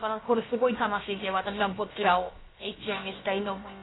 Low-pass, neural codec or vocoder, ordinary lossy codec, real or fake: 7.2 kHz; codec, 16 kHz, about 1 kbps, DyCAST, with the encoder's durations; AAC, 16 kbps; fake